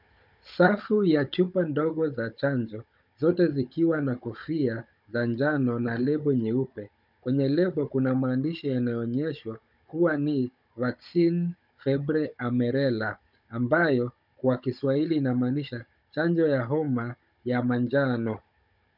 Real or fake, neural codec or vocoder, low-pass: fake; codec, 16 kHz, 16 kbps, FunCodec, trained on Chinese and English, 50 frames a second; 5.4 kHz